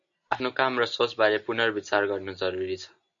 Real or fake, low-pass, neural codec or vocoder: real; 7.2 kHz; none